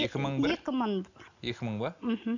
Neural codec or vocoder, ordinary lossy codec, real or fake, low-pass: none; none; real; 7.2 kHz